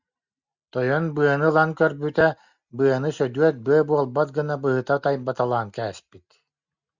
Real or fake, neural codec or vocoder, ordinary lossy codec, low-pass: real; none; Opus, 64 kbps; 7.2 kHz